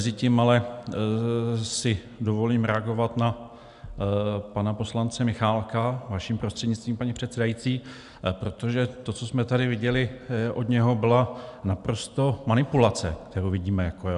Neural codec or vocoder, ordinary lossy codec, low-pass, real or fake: none; MP3, 96 kbps; 10.8 kHz; real